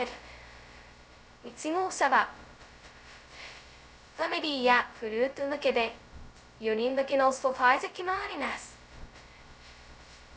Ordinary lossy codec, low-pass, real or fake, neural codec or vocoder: none; none; fake; codec, 16 kHz, 0.2 kbps, FocalCodec